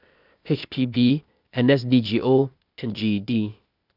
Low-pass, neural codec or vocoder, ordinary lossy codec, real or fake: 5.4 kHz; codec, 16 kHz, 0.8 kbps, ZipCodec; none; fake